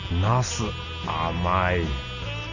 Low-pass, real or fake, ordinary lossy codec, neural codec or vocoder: 7.2 kHz; real; none; none